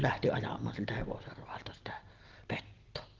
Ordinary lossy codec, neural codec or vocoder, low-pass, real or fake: Opus, 16 kbps; none; 7.2 kHz; real